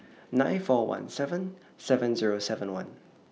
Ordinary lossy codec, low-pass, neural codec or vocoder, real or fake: none; none; none; real